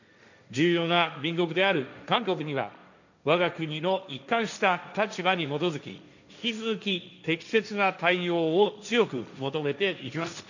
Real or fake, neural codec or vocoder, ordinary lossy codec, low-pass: fake; codec, 16 kHz, 1.1 kbps, Voila-Tokenizer; none; 7.2 kHz